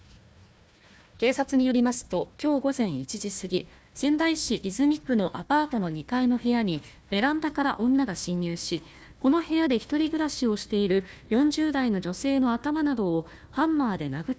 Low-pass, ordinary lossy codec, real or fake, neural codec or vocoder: none; none; fake; codec, 16 kHz, 1 kbps, FunCodec, trained on Chinese and English, 50 frames a second